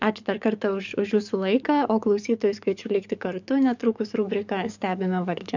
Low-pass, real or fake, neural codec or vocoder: 7.2 kHz; fake; codec, 44.1 kHz, 7.8 kbps, DAC